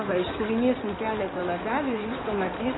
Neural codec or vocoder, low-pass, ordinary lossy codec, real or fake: codec, 16 kHz in and 24 kHz out, 2.2 kbps, FireRedTTS-2 codec; 7.2 kHz; AAC, 16 kbps; fake